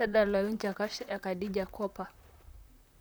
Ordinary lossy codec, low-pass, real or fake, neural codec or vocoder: none; none; fake; vocoder, 44.1 kHz, 128 mel bands, Pupu-Vocoder